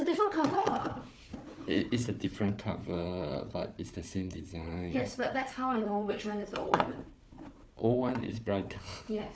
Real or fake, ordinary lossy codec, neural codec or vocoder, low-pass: fake; none; codec, 16 kHz, 4 kbps, FunCodec, trained on Chinese and English, 50 frames a second; none